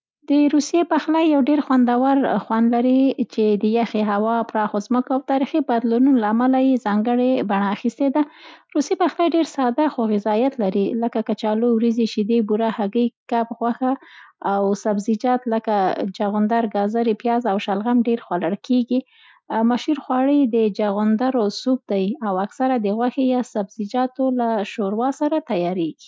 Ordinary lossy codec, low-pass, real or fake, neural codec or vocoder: none; none; real; none